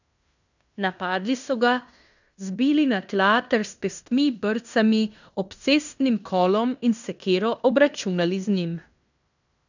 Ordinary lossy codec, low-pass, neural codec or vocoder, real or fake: none; 7.2 kHz; codec, 16 kHz in and 24 kHz out, 0.9 kbps, LongCat-Audio-Codec, fine tuned four codebook decoder; fake